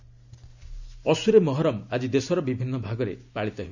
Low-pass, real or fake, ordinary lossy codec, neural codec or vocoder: 7.2 kHz; real; none; none